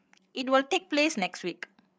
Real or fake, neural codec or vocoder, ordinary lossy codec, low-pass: fake; codec, 16 kHz, 8 kbps, FreqCodec, larger model; none; none